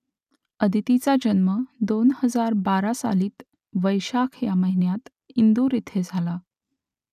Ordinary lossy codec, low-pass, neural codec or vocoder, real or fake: none; 14.4 kHz; none; real